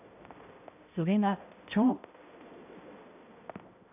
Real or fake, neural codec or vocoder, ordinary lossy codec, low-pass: fake; codec, 16 kHz, 0.5 kbps, X-Codec, HuBERT features, trained on balanced general audio; none; 3.6 kHz